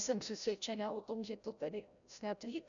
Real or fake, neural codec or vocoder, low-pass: fake; codec, 16 kHz, 0.5 kbps, FreqCodec, larger model; 7.2 kHz